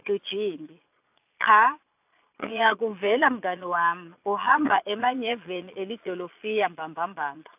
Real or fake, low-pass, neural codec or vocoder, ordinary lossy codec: fake; 3.6 kHz; vocoder, 22.05 kHz, 80 mel bands, Vocos; AAC, 32 kbps